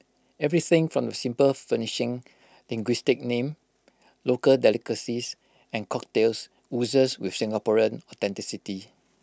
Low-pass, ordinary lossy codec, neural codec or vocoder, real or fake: none; none; none; real